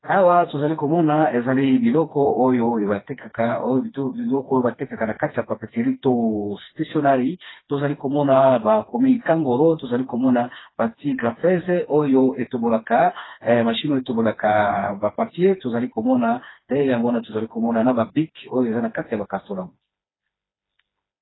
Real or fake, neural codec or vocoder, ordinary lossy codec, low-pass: fake; codec, 16 kHz, 2 kbps, FreqCodec, smaller model; AAC, 16 kbps; 7.2 kHz